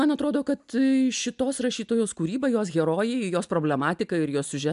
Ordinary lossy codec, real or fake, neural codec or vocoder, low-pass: AAC, 96 kbps; real; none; 10.8 kHz